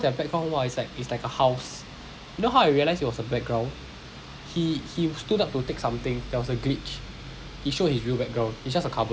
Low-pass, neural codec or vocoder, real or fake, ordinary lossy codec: none; none; real; none